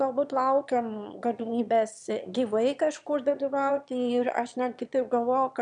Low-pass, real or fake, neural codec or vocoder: 9.9 kHz; fake; autoencoder, 22.05 kHz, a latent of 192 numbers a frame, VITS, trained on one speaker